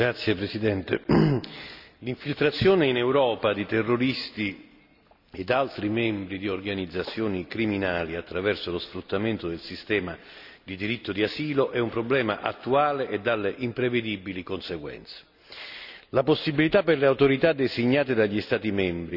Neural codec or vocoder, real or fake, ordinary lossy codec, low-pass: none; real; none; 5.4 kHz